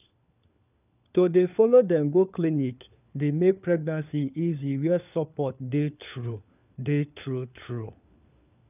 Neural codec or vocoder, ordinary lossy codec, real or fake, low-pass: codec, 16 kHz, 4 kbps, FunCodec, trained on LibriTTS, 50 frames a second; none; fake; 3.6 kHz